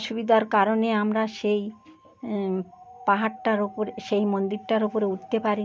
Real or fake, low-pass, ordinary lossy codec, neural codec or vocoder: real; none; none; none